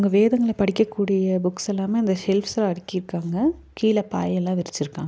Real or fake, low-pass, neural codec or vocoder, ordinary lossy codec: real; none; none; none